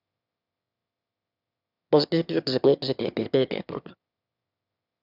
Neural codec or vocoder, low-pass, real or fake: autoencoder, 22.05 kHz, a latent of 192 numbers a frame, VITS, trained on one speaker; 5.4 kHz; fake